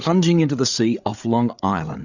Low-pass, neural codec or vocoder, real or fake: 7.2 kHz; codec, 16 kHz, 8 kbps, FreqCodec, larger model; fake